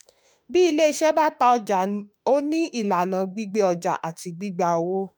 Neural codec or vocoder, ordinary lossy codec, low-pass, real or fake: autoencoder, 48 kHz, 32 numbers a frame, DAC-VAE, trained on Japanese speech; none; none; fake